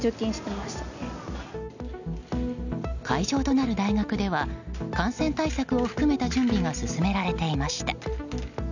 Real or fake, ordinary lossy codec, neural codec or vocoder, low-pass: real; none; none; 7.2 kHz